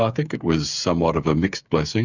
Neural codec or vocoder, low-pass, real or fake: codec, 16 kHz, 8 kbps, FreqCodec, smaller model; 7.2 kHz; fake